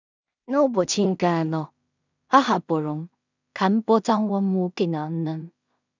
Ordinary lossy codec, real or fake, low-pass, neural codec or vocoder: none; fake; 7.2 kHz; codec, 16 kHz in and 24 kHz out, 0.4 kbps, LongCat-Audio-Codec, two codebook decoder